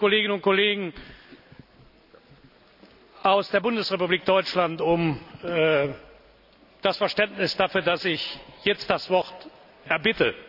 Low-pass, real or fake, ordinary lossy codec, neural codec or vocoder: 5.4 kHz; real; none; none